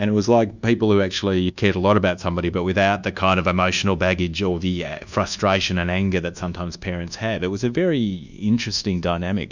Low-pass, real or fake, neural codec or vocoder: 7.2 kHz; fake; codec, 24 kHz, 1.2 kbps, DualCodec